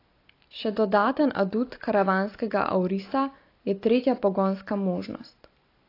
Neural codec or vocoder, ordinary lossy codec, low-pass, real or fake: none; AAC, 32 kbps; 5.4 kHz; real